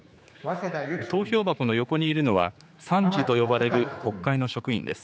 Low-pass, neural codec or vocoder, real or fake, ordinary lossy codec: none; codec, 16 kHz, 4 kbps, X-Codec, HuBERT features, trained on general audio; fake; none